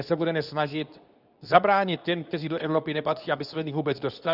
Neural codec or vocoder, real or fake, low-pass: codec, 24 kHz, 0.9 kbps, WavTokenizer, medium speech release version 2; fake; 5.4 kHz